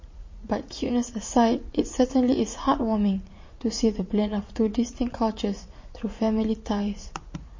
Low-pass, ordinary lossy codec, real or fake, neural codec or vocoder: 7.2 kHz; MP3, 32 kbps; fake; vocoder, 44.1 kHz, 80 mel bands, Vocos